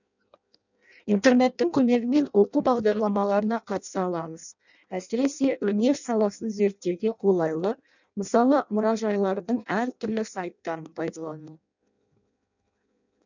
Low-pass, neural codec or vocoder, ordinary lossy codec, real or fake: 7.2 kHz; codec, 16 kHz in and 24 kHz out, 0.6 kbps, FireRedTTS-2 codec; none; fake